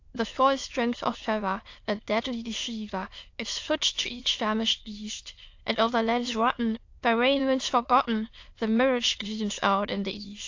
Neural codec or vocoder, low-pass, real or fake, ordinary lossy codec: autoencoder, 22.05 kHz, a latent of 192 numbers a frame, VITS, trained on many speakers; 7.2 kHz; fake; AAC, 48 kbps